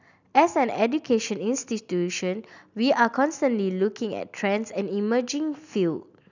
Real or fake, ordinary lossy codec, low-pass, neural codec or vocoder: real; none; 7.2 kHz; none